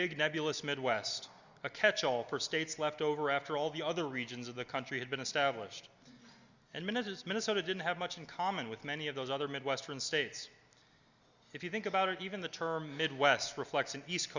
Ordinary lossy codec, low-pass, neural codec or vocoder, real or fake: Opus, 64 kbps; 7.2 kHz; none; real